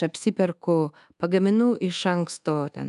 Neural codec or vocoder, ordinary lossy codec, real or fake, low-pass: codec, 24 kHz, 1.2 kbps, DualCodec; MP3, 96 kbps; fake; 10.8 kHz